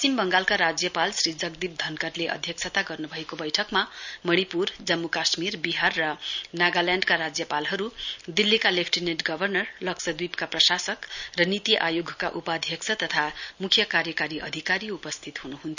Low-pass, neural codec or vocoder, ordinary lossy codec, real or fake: 7.2 kHz; none; none; real